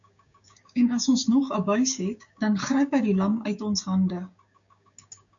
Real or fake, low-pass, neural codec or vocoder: fake; 7.2 kHz; codec, 16 kHz, 6 kbps, DAC